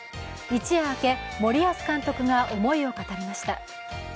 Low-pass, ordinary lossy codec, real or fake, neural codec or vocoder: none; none; real; none